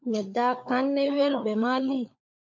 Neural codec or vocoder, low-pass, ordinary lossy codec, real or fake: codec, 16 kHz, 16 kbps, FunCodec, trained on LibriTTS, 50 frames a second; 7.2 kHz; MP3, 48 kbps; fake